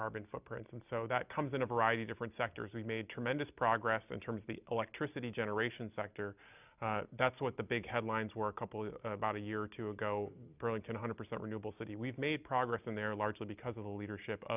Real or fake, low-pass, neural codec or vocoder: real; 3.6 kHz; none